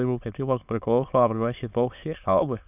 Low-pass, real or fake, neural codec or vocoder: 3.6 kHz; fake; autoencoder, 22.05 kHz, a latent of 192 numbers a frame, VITS, trained on many speakers